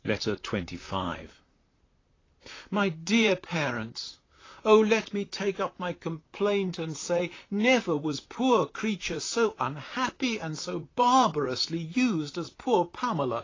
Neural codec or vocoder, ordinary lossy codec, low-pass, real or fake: vocoder, 44.1 kHz, 128 mel bands, Pupu-Vocoder; AAC, 32 kbps; 7.2 kHz; fake